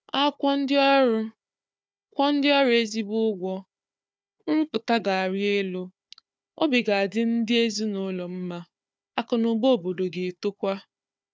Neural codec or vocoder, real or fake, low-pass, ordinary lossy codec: codec, 16 kHz, 4 kbps, FunCodec, trained on Chinese and English, 50 frames a second; fake; none; none